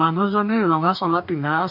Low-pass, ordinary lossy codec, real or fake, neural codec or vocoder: 5.4 kHz; none; fake; codec, 44.1 kHz, 2.6 kbps, DAC